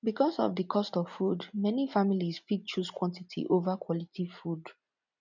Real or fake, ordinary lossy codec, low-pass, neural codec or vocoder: real; none; 7.2 kHz; none